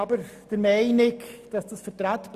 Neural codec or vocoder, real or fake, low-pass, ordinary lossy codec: none; real; 14.4 kHz; Opus, 64 kbps